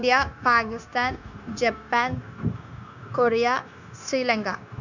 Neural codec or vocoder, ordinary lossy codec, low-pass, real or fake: codec, 16 kHz, 0.9 kbps, LongCat-Audio-Codec; none; 7.2 kHz; fake